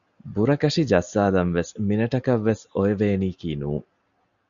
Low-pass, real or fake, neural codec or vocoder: 7.2 kHz; real; none